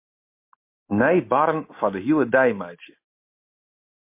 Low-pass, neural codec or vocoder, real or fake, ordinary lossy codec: 3.6 kHz; none; real; MP3, 24 kbps